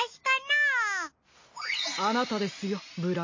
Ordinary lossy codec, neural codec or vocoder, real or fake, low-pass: MP3, 32 kbps; none; real; 7.2 kHz